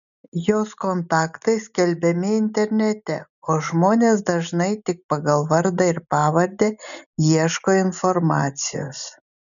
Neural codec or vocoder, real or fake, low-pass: none; real; 7.2 kHz